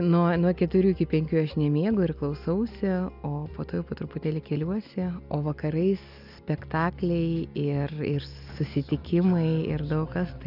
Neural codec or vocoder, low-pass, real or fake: none; 5.4 kHz; real